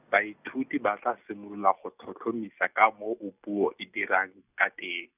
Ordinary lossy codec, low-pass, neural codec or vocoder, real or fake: none; 3.6 kHz; none; real